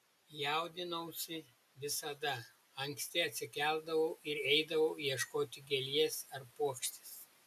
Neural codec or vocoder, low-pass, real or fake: none; 14.4 kHz; real